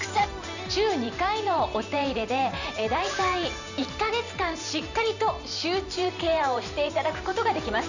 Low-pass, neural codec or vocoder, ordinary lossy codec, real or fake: 7.2 kHz; vocoder, 44.1 kHz, 128 mel bands every 512 samples, BigVGAN v2; none; fake